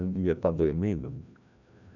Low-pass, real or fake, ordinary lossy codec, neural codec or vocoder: 7.2 kHz; fake; none; codec, 16 kHz, 1 kbps, FreqCodec, larger model